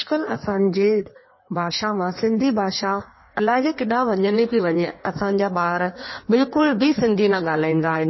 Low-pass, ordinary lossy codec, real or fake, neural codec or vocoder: 7.2 kHz; MP3, 24 kbps; fake; codec, 16 kHz in and 24 kHz out, 1.1 kbps, FireRedTTS-2 codec